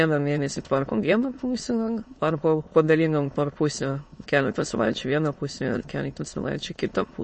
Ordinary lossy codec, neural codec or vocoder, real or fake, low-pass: MP3, 32 kbps; autoencoder, 22.05 kHz, a latent of 192 numbers a frame, VITS, trained on many speakers; fake; 9.9 kHz